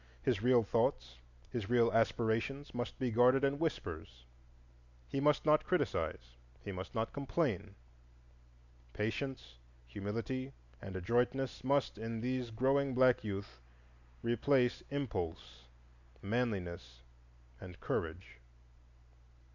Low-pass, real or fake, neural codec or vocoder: 7.2 kHz; real; none